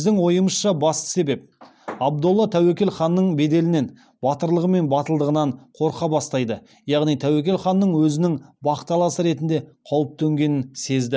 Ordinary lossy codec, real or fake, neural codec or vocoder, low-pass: none; real; none; none